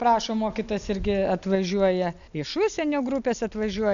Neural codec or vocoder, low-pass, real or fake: none; 7.2 kHz; real